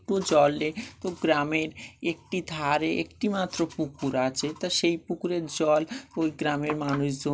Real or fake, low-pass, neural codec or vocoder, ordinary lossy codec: real; none; none; none